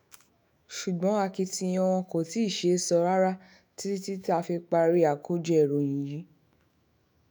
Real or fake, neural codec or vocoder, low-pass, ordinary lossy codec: fake; autoencoder, 48 kHz, 128 numbers a frame, DAC-VAE, trained on Japanese speech; none; none